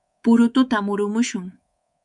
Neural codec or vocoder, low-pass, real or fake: codec, 24 kHz, 3.1 kbps, DualCodec; 10.8 kHz; fake